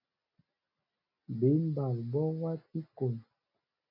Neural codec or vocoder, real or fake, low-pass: none; real; 5.4 kHz